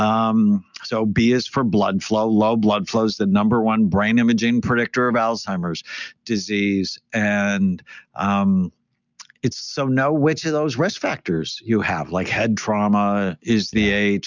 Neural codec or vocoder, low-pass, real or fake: none; 7.2 kHz; real